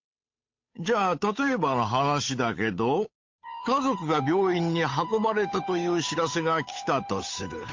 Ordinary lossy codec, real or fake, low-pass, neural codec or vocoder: MP3, 48 kbps; fake; 7.2 kHz; codec, 16 kHz, 8 kbps, FunCodec, trained on Chinese and English, 25 frames a second